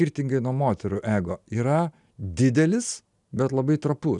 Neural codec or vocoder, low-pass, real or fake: none; 10.8 kHz; real